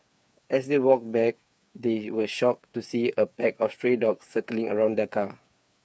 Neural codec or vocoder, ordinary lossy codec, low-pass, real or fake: codec, 16 kHz, 8 kbps, FreqCodec, smaller model; none; none; fake